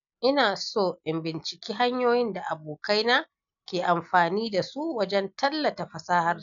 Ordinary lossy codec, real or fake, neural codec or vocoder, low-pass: none; real; none; 7.2 kHz